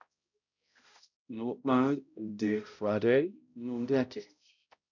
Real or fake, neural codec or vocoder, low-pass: fake; codec, 16 kHz, 0.5 kbps, X-Codec, HuBERT features, trained on balanced general audio; 7.2 kHz